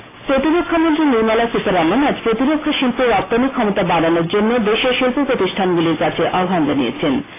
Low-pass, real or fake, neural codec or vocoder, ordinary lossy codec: 3.6 kHz; real; none; AAC, 16 kbps